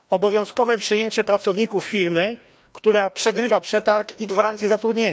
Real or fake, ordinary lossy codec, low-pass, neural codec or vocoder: fake; none; none; codec, 16 kHz, 1 kbps, FreqCodec, larger model